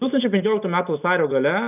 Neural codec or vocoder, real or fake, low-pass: none; real; 3.6 kHz